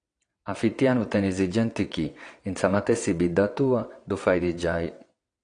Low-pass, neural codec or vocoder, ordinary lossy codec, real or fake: 9.9 kHz; vocoder, 22.05 kHz, 80 mel bands, WaveNeXt; AAC, 48 kbps; fake